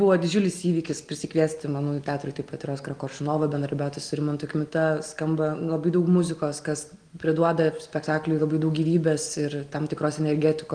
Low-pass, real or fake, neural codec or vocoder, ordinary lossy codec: 9.9 kHz; real; none; Opus, 24 kbps